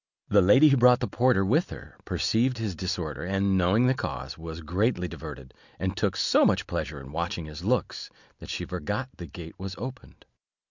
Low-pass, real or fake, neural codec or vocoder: 7.2 kHz; real; none